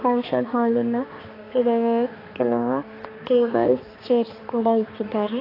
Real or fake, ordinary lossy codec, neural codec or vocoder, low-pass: fake; AAC, 24 kbps; codec, 16 kHz, 2 kbps, X-Codec, HuBERT features, trained on balanced general audio; 5.4 kHz